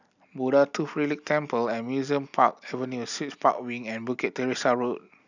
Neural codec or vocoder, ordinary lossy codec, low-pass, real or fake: none; none; 7.2 kHz; real